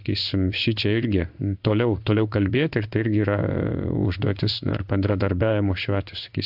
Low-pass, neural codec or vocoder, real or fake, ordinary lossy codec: 5.4 kHz; codec, 16 kHz in and 24 kHz out, 1 kbps, XY-Tokenizer; fake; AAC, 48 kbps